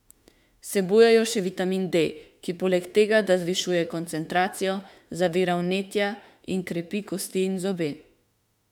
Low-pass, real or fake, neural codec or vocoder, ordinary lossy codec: 19.8 kHz; fake; autoencoder, 48 kHz, 32 numbers a frame, DAC-VAE, trained on Japanese speech; none